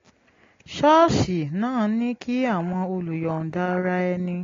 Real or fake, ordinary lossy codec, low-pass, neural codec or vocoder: real; AAC, 32 kbps; 7.2 kHz; none